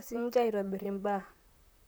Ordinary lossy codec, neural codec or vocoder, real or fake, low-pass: none; vocoder, 44.1 kHz, 128 mel bands, Pupu-Vocoder; fake; none